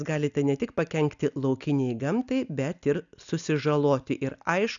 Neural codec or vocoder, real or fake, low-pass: none; real; 7.2 kHz